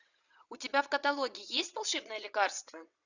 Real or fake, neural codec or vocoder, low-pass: fake; vocoder, 22.05 kHz, 80 mel bands, WaveNeXt; 7.2 kHz